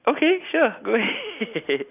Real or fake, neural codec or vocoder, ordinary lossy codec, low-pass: real; none; none; 3.6 kHz